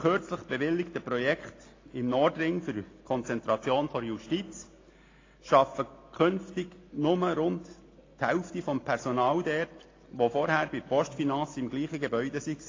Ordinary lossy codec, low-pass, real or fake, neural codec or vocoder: AAC, 32 kbps; 7.2 kHz; real; none